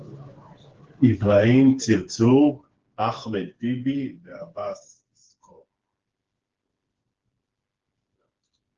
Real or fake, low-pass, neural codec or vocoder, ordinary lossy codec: fake; 7.2 kHz; codec, 16 kHz, 4 kbps, FreqCodec, smaller model; Opus, 16 kbps